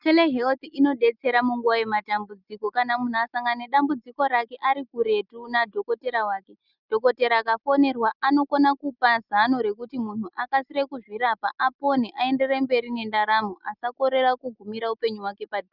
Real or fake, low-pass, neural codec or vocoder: real; 5.4 kHz; none